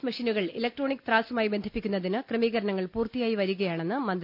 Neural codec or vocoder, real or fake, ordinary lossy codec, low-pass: none; real; none; 5.4 kHz